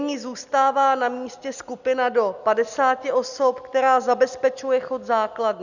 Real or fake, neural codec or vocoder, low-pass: real; none; 7.2 kHz